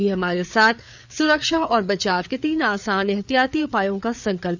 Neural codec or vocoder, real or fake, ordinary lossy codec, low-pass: codec, 16 kHz, 4 kbps, FreqCodec, larger model; fake; none; 7.2 kHz